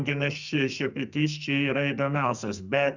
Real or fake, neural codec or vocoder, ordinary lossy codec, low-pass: fake; codec, 44.1 kHz, 2.6 kbps, SNAC; Opus, 64 kbps; 7.2 kHz